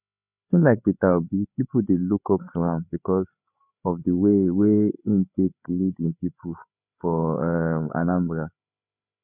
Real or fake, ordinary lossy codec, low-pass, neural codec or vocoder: fake; none; 3.6 kHz; codec, 16 kHz, 8 kbps, FreqCodec, larger model